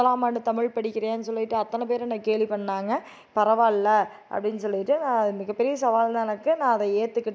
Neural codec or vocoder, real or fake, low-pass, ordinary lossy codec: none; real; none; none